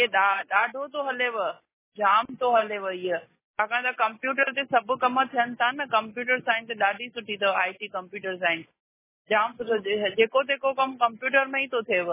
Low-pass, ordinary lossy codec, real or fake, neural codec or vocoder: 3.6 kHz; MP3, 16 kbps; real; none